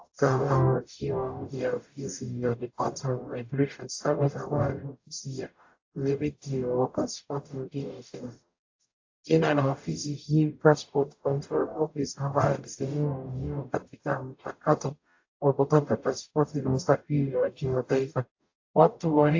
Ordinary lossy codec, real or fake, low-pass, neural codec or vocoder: AAC, 48 kbps; fake; 7.2 kHz; codec, 44.1 kHz, 0.9 kbps, DAC